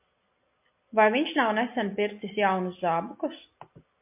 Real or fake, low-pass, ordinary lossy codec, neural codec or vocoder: real; 3.6 kHz; MP3, 32 kbps; none